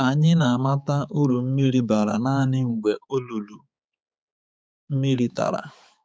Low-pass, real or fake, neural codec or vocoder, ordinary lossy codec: none; fake; codec, 16 kHz, 4 kbps, X-Codec, HuBERT features, trained on balanced general audio; none